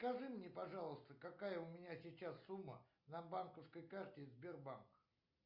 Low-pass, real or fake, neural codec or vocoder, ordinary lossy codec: 5.4 kHz; real; none; Opus, 64 kbps